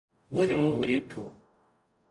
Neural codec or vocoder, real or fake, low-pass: codec, 44.1 kHz, 0.9 kbps, DAC; fake; 10.8 kHz